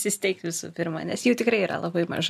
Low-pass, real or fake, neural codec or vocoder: 14.4 kHz; real; none